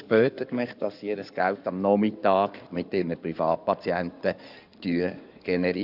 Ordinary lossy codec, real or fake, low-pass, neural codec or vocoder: none; fake; 5.4 kHz; codec, 16 kHz in and 24 kHz out, 2.2 kbps, FireRedTTS-2 codec